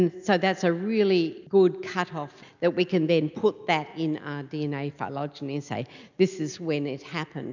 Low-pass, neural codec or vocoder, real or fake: 7.2 kHz; none; real